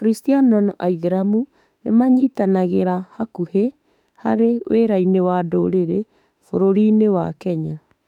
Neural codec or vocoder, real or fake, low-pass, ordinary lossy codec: autoencoder, 48 kHz, 32 numbers a frame, DAC-VAE, trained on Japanese speech; fake; 19.8 kHz; none